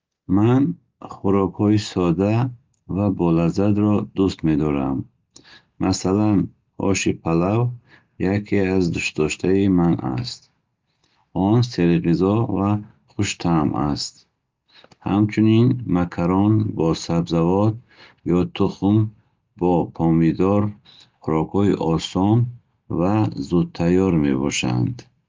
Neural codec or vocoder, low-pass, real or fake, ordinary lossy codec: none; 7.2 kHz; real; Opus, 24 kbps